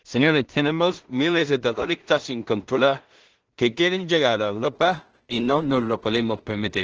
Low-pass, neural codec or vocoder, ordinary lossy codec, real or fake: 7.2 kHz; codec, 16 kHz in and 24 kHz out, 0.4 kbps, LongCat-Audio-Codec, two codebook decoder; Opus, 16 kbps; fake